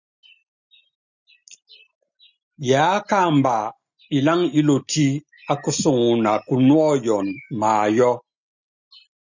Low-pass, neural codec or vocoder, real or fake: 7.2 kHz; none; real